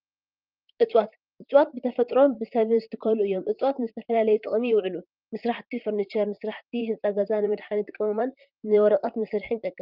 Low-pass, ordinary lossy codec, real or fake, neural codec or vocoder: 5.4 kHz; Opus, 24 kbps; fake; vocoder, 44.1 kHz, 128 mel bands, Pupu-Vocoder